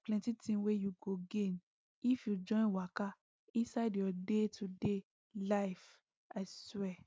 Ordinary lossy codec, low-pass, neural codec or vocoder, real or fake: none; none; none; real